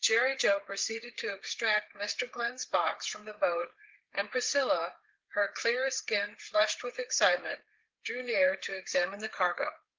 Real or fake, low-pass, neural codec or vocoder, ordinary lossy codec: fake; 7.2 kHz; codec, 16 kHz, 8 kbps, FreqCodec, smaller model; Opus, 16 kbps